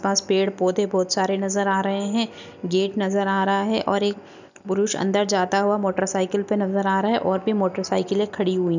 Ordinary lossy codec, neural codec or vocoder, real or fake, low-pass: none; none; real; 7.2 kHz